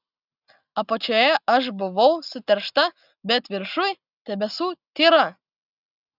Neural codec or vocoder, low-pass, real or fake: none; 5.4 kHz; real